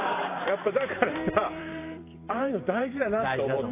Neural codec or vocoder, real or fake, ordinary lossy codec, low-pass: vocoder, 44.1 kHz, 128 mel bands every 512 samples, BigVGAN v2; fake; AAC, 24 kbps; 3.6 kHz